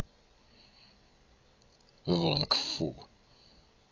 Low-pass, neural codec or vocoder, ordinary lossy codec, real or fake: 7.2 kHz; codec, 16 kHz, 16 kbps, FreqCodec, larger model; AAC, 48 kbps; fake